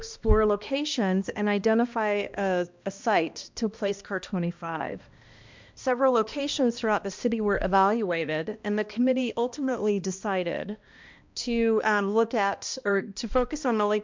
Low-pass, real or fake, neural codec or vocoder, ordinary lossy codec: 7.2 kHz; fake; codec, 16 kHz, 1 kbps, X-Codec, HuBERT features, trained on balanced general audio; MP3, 64 kbps